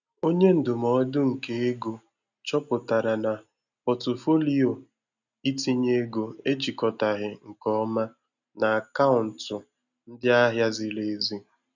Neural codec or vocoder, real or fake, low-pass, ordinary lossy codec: none; real; 7.2 kHz; none